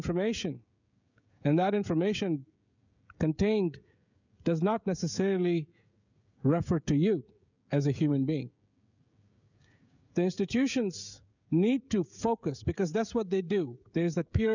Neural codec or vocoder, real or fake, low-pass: codec, 16 kHz, 16 kbps, FreqCodec, smaller model; fake; 7.2 kHz